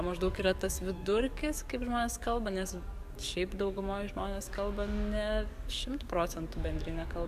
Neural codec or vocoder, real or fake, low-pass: codec, 44.1 kHz, 7.8 kbps, DAC; fake; 14.4 kHz